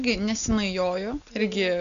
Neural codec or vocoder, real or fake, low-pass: none; real; 7.2 kHz